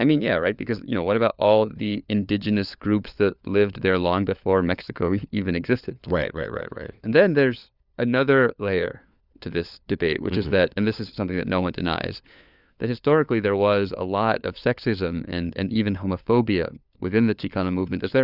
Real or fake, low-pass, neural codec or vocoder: fake; 5.4 kHz; codec, 16 kHz, 4 kbps, FunCodec, trained on LibriTTS, 50 frames a second